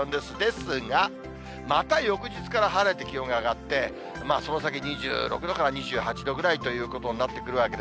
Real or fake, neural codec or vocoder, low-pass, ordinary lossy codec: real; none; none; none